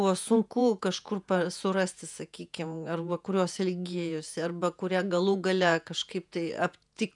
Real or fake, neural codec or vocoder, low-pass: fake; vocoder, 48 kHz, 128 mel bands, Vocos; 10.8 kHz